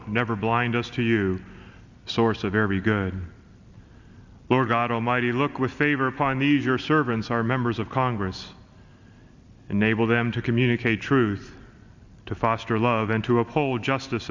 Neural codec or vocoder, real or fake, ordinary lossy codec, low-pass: none; real; Opus, 64 kbps; 7.2 kHz